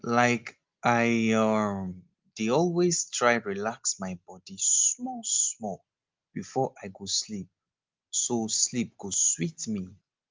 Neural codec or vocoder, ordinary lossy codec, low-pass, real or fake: none; Opus, 32 kbps; 7.2 kHz; real